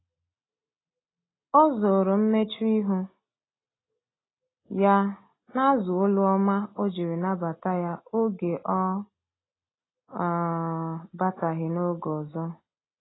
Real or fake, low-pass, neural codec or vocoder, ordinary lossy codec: real; 7.2 kHz; none; AAC, 16 kbps